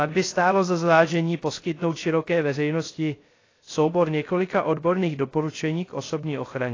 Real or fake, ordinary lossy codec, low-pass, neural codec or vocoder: fake; AAC, 32 kbps; 7.2 kHz; codec, 16 kHz, 0.3 kbps, FocalCodec